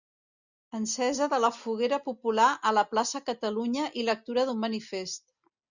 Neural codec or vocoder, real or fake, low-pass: none; real; 7.2 kHz